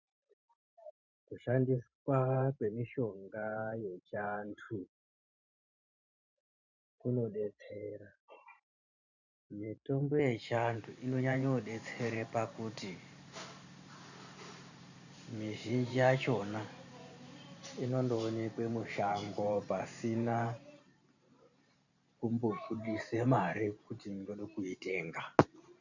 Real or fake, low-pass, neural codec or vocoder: fake; 7.2 kHz; vocoder, 44.1 kHz, 128 mel bands every 512 samples, BigVGAN v2